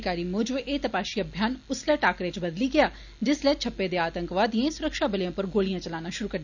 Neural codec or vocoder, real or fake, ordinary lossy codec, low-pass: none; real; none; 7.2 kHz